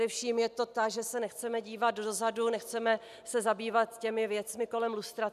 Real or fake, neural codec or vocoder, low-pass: real; none; 14.4 kHz